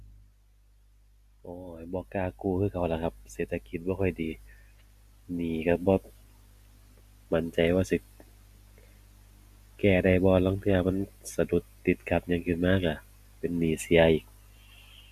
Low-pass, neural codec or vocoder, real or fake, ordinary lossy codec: 14.4 kHz; none; real; AAC, 96 kbps